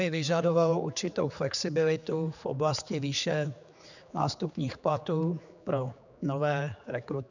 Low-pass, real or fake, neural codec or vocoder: 7.2 kHz; fake; codec, 16 kHz, 4 kbps, X-Codec, HuBERT features, trained on general audio